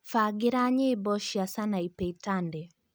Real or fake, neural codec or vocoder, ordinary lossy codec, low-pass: real; none; none; none